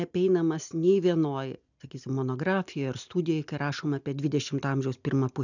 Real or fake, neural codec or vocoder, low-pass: real; none; 7.2 kHz